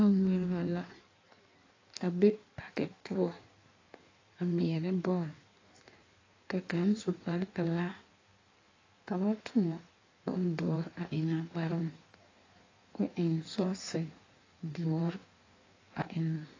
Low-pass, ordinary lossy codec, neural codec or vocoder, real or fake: 7.2 kHz; AAC, 32 kbps; codec, 16 kHz in and 24 kHz out, 1.1 kbps, FireRedTTS-2 codec; fake